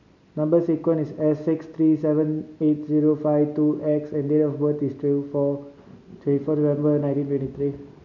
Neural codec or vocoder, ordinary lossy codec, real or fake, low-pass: none; none; real; 7.2 kHz